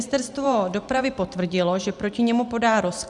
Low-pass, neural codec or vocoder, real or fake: 10.8 kHz; vocoder, 44.1 kHz, 128 mel bands every 512 samples, BigVGAN v2; fake